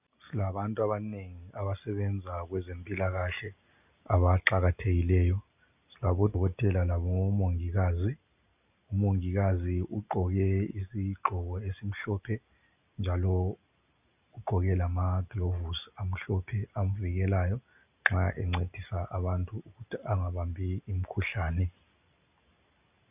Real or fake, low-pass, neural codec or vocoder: real; 3.6 kHz; none